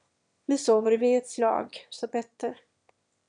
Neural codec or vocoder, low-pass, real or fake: autoencoder, 22.05 kHz, a latent of 192 numbers a frame, VITS, trained on one speaker; 9.9 kHz; fake